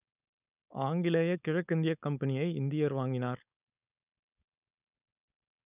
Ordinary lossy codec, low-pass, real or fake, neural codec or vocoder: none; 3.6 kHz; fake; codec, 16 kHz, 4.8 kbps, FACodec